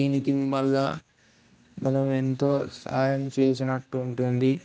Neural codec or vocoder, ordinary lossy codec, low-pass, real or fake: codec, 16 kHz, 1 kbps, X-Codec, HuBERT features, trained on general audio; none; none; fake